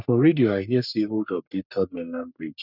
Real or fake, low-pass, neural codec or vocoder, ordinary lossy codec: fake; 5.4 kHz; codec, 44.1 kHz, 3.4 kbps, Pupu-Codec; none